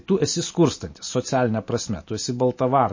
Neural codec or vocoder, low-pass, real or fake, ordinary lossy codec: none; 7.2 kHz; real; MP3, 32 kbps